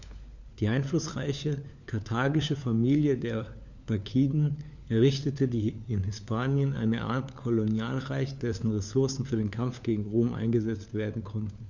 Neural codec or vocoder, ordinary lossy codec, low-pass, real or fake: codec, 16 kHz, 4 kbps, FunCodec, trained on LibriTTS, 50 frames a second; none; 7.2 kHz; fake